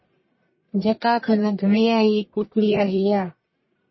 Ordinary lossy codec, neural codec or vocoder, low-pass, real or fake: MP3, 24 kbps; codec, 44.1 kHz, 1.7 kbps, Pupu-Codec; 7.2 kHz; fake